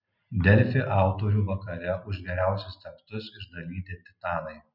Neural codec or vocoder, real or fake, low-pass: none; real; 5.4 kHz